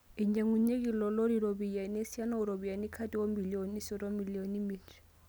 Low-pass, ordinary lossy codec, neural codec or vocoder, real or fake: none; none; none; real